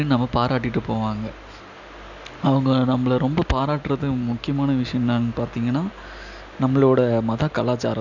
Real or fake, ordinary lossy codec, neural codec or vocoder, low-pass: real; none; none; 7.2 kHz